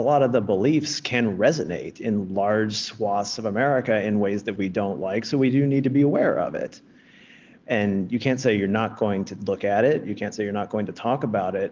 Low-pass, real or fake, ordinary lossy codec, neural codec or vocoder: 7.2 kHz; real; Opus, 32 kbps; none